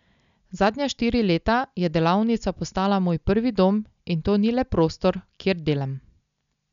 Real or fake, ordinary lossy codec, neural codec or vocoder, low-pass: real; none; none; 7.2 kHz